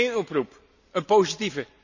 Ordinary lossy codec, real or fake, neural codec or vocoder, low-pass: none; real; none; 7.2 kHz